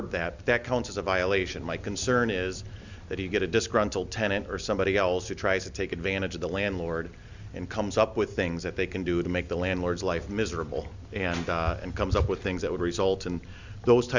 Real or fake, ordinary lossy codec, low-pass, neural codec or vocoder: real; Opus, 64 kbps; 7.2 kHz; none